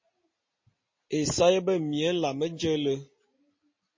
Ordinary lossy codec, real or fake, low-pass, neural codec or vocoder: MP3, 32 kbps; real; 7.2 kHz; none